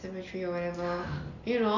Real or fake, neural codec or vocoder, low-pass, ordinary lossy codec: real; none; 7.2 kHz; none